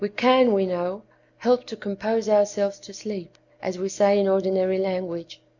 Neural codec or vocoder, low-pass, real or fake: none; 7.2 kHz; real